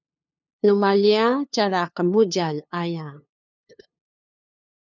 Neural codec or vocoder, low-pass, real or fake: codec, 16 kHz, 2 kbps, FunCodec, trained on LibriTTS, 25 frames a second; 7.2 kHz; fake